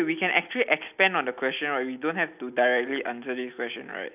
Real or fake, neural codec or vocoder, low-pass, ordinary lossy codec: real; none; 3.6 kHz; none